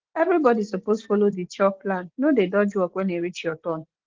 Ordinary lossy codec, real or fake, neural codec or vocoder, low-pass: Opus, 16 kbps; real; none; 7.2 kHz